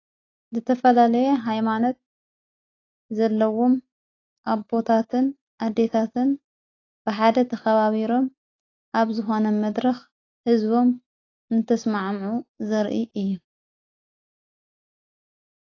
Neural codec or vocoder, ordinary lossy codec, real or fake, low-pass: none; AAC, 32 kbps; real; 7.2 kHz